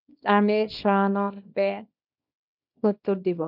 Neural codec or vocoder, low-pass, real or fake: codec, 24 kHz, 0.9 kbps, WavTokenizer, small release; 5.4 kHz; fake